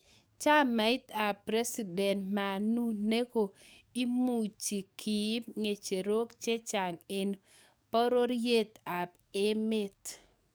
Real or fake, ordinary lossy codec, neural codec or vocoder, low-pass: fake; none; codec, 44.1 kHz, 7.8 kbps, DAC; none